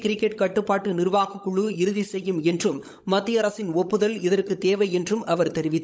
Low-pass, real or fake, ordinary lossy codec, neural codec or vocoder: none; fake; none; codec, 16 kHz, 16 kbps, FunCodec, trained on LibriTTS, 50 frames a second